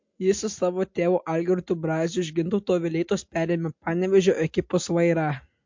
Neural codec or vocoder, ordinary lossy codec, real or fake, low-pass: none; MP3, 48 kbps; real; 7.2 kHz